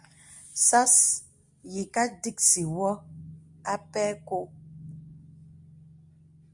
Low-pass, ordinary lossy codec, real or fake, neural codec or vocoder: 10.8 kHz; Opus, 64 kbps; real; none